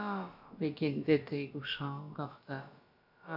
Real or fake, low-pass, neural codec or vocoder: fake; 5.4 kHz; codec, 16 kHz, about 1 kbps, DyCAST, with the encoder's durations